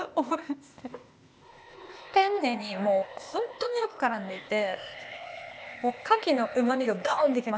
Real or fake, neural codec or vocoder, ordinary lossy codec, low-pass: fake; codec, 16 kHz, 0.8 kbps, ZipCodec; none; none